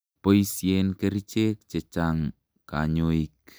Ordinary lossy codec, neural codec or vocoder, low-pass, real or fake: none; none; none; real